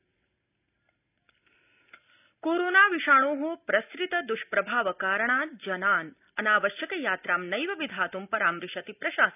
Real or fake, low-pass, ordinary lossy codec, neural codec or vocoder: real; 3.6 kHz; none; none